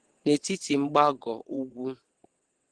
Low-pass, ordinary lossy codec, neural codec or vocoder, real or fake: 9.9 kHz; Opus, 16 kbps; vocoder, 22.05 kHz, 80 mel bands, WaveNeXt; fake